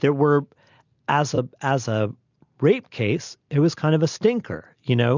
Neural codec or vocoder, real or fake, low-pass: none; real; 7.2 kHz